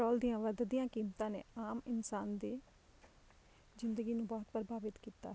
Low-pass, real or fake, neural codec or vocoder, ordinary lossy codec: none; real; none; none